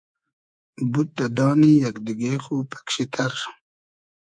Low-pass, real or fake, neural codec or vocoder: 9.9 kHz; fake; codec, 24 kHz, 3.1 kbps, DualCodec